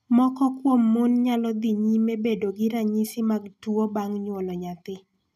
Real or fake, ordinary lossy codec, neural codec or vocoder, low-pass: real; none; none; 14.4 kHz